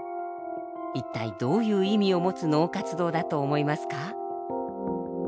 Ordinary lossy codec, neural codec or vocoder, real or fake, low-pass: none; none; real; none